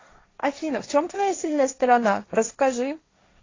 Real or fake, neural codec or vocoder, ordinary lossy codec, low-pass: fake; codec, 16 kHz, 1.1 kbps, Voila-Tokenizer; AAC, 32 kbps; 7.2 kHz